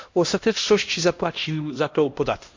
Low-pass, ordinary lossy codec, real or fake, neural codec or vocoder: 7.2 kHz; MP3, 48 kbps; fake; codec, 16 kHz, 1 kbps, X-Codec, HuBERT features, trained on LibriSpeech